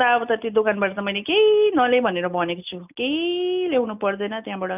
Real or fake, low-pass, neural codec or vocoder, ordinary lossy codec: real; 3.6 kHz; none; none